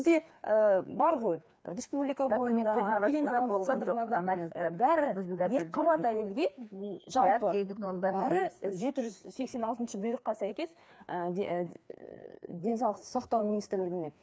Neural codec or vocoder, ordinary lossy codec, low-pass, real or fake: codec, 16 kHz, 2 kbps, FreqCodec, larger model; none; none; fake